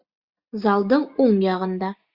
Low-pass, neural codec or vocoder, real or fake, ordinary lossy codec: 5.4 kHz; none; real; Opus, 64 kbps